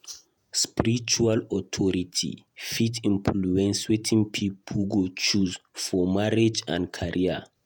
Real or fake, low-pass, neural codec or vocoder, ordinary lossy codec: fake; none; vocoder, 48 kHz, 128 mel bands, Vocos; none